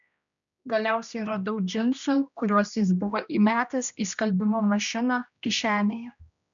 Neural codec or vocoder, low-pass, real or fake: codec, 16 kHz, 1 kbps, X-Codec, HuBERT features, trained on general audio; 7.2 kHz; fake